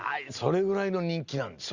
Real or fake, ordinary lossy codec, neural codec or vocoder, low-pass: real; Opus, 64 kbps; none; 7.2 kHz